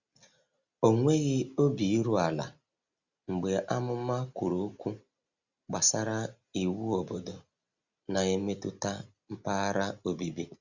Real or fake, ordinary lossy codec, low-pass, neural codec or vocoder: real; Opus, 64 kbps; 7.2 kHz; none